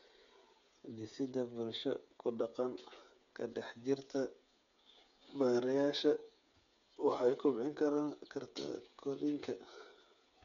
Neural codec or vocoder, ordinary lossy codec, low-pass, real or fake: codec, 16 kHz, 8 kbps, FreqCodec, smaller model; none; 7.2 kHz; fake